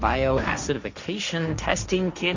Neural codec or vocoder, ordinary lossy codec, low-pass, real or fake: codec, 16 kHz, 1.1 kbps, Voila-Tokenizer; Opus, 64 kbps; 7.2 kHz; fake